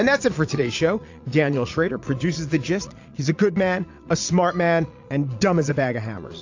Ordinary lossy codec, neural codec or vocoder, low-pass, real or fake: AAC, 48 kbps; vocoder, 22.05 kHz, 80 mel bands, Vocos; 7.2 kHz; fake